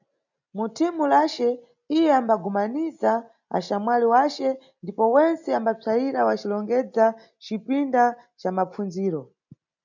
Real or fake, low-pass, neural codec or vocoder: real; 7.2 kHz; none